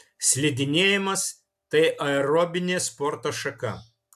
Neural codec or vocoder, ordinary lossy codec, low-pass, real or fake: none; AAC, 96 kbps; 14.4 kHz; real